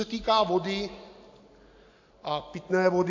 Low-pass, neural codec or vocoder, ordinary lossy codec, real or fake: 7.2 kHz; none; AAC, 48 kbps; real